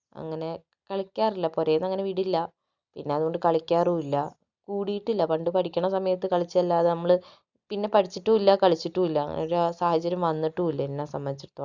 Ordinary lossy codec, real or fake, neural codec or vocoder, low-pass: Opus, 64 kbps; real; none; 7.2 kHz